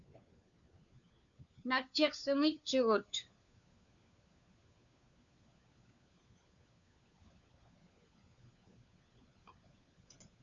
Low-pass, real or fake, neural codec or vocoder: 7.2 kHz; fake; codec, 16 kHz, 4 kbps, FunCodec, trained on LibriTTS, 50 frames a second